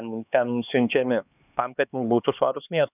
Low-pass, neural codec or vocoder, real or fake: 3.6 kHz; codec, 16 kHz, 2 kbps, X-Codec, HuBERT features, trained on LibriSpeech; fake